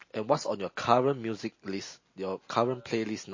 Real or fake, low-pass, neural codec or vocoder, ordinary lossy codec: real; 7.2 kHz; none; MP3, 32 kbps